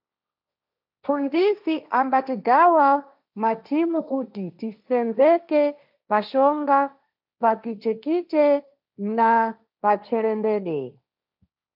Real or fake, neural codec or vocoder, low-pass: fake; codec, 16 kHz, 1.1 kbps, Voila-Tokenizer; 5.4 kHz